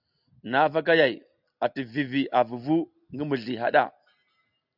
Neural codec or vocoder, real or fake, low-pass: none; real; 5.4 kHz